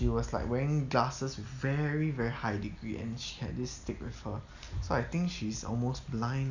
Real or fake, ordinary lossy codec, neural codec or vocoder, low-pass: real; none; none; 7.2 kHz